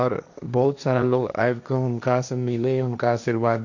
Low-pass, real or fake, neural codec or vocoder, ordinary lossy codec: 7.2 kHz; fake; codec, 16 kHz, 1.1 kbps, Voila-Tokenizer; none